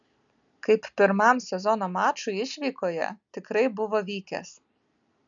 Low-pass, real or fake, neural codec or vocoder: 7.2 kHz; real; none